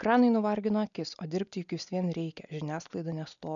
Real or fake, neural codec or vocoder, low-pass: real; none; 7.2 kHz